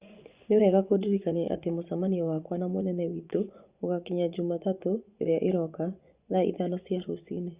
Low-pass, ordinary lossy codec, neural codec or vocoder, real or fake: 3.6 kHz; Opus, 64 kbps; vocoder, 24 kHz, 100 mel bands, Vocos; fake